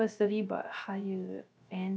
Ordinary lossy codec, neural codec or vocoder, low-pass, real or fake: none; codec, 16 kHz, 0.3 kbps, FocalCodec; none; fake